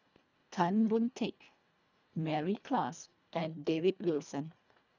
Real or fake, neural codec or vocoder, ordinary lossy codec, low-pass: fake; codec, 24 kHz, 1.5 kbps, HILCodec; none; 7.2 kHz